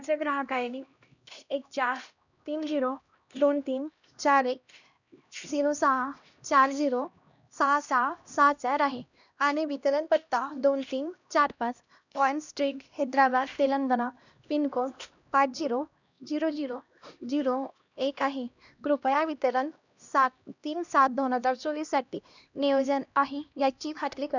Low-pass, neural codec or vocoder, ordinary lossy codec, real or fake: 7.2 kHz; codec, 16 kHz, 1 kbps, X-Codec, HuBERT features, trained on LibriSpeech; none; fake